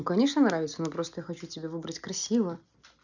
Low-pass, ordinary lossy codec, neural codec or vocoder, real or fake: 7.2 kHz; none; none; real